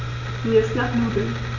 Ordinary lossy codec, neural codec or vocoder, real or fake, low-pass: none; none; real; 7.2 kHz